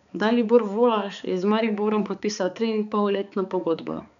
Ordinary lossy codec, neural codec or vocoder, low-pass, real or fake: none; codec, 16 kHz, 4 kbps, X-Codec, HuBERT features, trained on balanced general audio; 7.2 kHz; fake